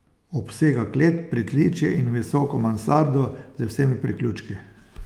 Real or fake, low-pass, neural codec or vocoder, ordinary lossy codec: fake; 19.8 kHz; autoencoder, 48 kHz, 128 numbers a frame, DAC-VAE, trained on Japanese speech; Opus, 32 kbps